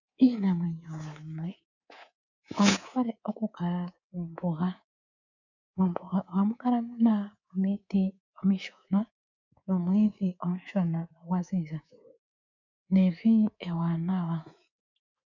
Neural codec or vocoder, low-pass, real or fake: codec, 24 kHz, 3.1 kbps, DualCodec; 7.2 kHz; fake